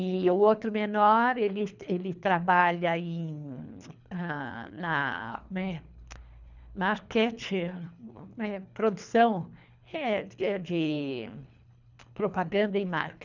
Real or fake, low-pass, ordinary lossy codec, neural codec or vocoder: fake; 7.2 kHz; none; codec, 24 kHz, 3 kbps, HILCodec